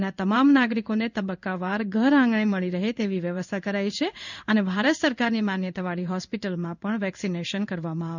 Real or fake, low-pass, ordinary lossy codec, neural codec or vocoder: fake; 7.2 kHz; none; codec, 16 kHz in and 24 kHz out, 1 kbps, XY-Tokenizer